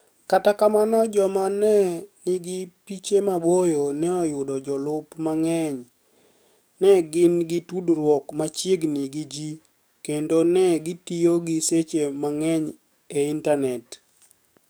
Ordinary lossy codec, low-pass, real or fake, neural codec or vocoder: none; none; fake; codec, 44.1 kHz, 7.8 kbps, DAC